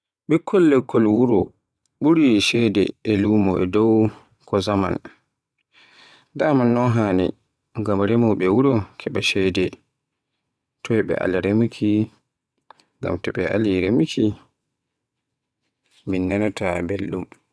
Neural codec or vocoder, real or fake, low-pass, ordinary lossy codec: vocoder, 22.05 kHz, 80 mel bands, WaveNeXt; fake; none; none